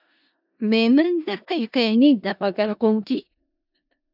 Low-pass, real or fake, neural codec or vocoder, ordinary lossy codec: 5.4 kHz; fake; codec, 16 kHz in and 24 kHz out, 0.4 kbps, LongCat-Audio-Codec, four codebook decoder; AAC, 48 kbps